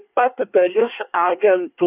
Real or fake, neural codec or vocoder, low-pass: fake; codec, 24 kHz, 1 kbps, SNAC; 3.6 kHz